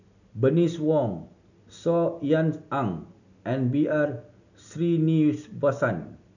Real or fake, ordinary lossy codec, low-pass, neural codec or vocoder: real; none; 7.2 kHz; none